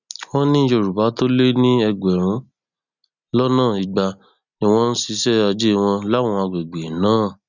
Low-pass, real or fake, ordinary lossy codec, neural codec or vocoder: 7.2 kHz; real; none; none